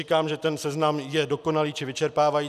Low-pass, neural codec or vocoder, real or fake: 14.4 kHz; none; real